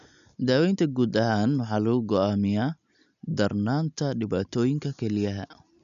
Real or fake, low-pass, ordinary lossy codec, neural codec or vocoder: real; 7.2 kHz; none; none